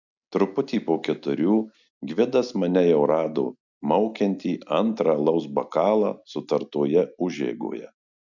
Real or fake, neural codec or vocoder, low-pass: real; none; 7.2 kHz